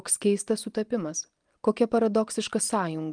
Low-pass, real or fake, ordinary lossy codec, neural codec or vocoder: 9.9 kHz; real; Opus, 32 kbps; none